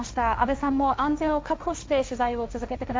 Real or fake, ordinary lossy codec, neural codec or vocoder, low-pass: fake; none; codec, 16 kHz, 1.1 kbps, Voila-Tokenizer; none